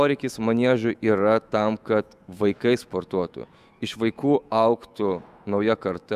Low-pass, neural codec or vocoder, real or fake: 14.4 kHz; autoencoder, 48 kHz, 128 numbers a frame, DAC-VAE, trained on Japanese speech; fake